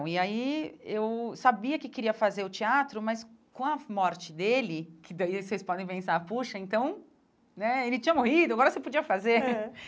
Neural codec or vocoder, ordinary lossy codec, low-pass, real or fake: none; none; none; real